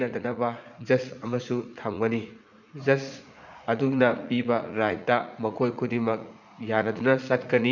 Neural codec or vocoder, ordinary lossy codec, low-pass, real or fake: vocoder, 44.1 kHz, 80 mel bands, Vocos; none; 7.2 kHz; fake